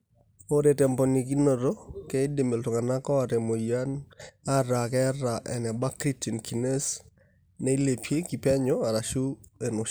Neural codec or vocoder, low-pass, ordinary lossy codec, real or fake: none; none; none; real